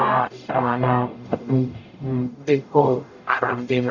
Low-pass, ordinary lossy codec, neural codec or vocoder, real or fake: 7.2 kHz; none; codec, 44.1 kHz, 0.9 kbps, DAC; fake